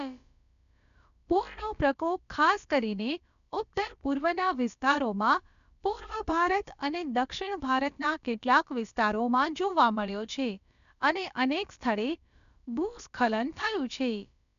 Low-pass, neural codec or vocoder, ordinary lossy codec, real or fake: 7.2 kHz; codec, 16 kHz, about 1 kbps, DyCAST, with the encoder's durations; none; fake